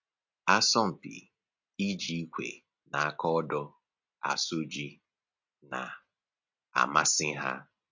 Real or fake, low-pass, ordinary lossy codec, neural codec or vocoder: real; 7.2 kHz; MP3, 48 kbps; none